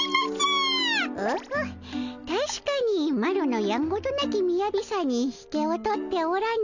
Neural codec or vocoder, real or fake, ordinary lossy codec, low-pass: none; real; none; 7.2 kHz